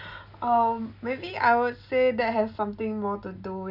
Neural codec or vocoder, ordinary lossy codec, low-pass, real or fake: none; none; 5.4 kHz; real